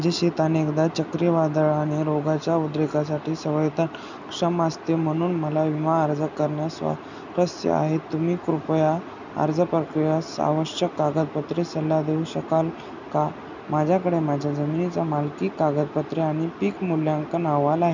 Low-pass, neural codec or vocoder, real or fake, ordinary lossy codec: 7.2 kHz; none; real; none